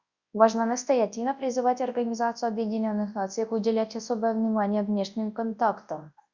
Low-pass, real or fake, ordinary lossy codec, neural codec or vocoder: 7.2 kHz; fake; Opus, 64 kbps; codec, 24 kHz, 0.9 kbps, WavTokenizer, large speech release